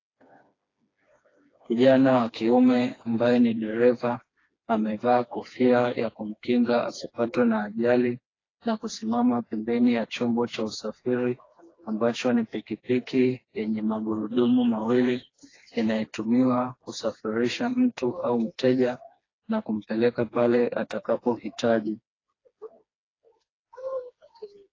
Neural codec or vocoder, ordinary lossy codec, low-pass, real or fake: codec, 16 kHz, 2 kbps, FreqCodec, smaller model; AAC, 32 kbps; 7.2 kHz; fake